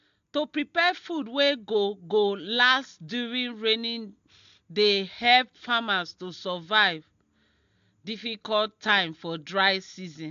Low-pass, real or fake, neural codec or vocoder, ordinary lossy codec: 7.2 kHz; real; none; AAC, 64 kbps